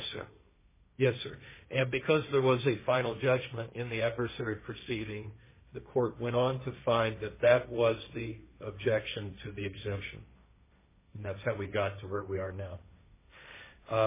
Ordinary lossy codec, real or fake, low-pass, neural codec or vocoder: MP3, 16 kbps; fake; 3.6 kHz; codec, 16 kHz, 1.1 kbps, Voila-Tokenizer